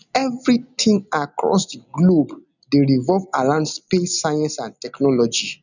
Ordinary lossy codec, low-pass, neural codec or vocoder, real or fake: none; 7.2 kHz; none; real